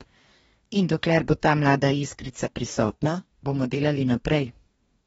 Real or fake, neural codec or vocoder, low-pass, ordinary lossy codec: fake; codec, 44.1 kHz, 2.6 kbps, DAC; 19.8 kHz; AAC, 24 kbps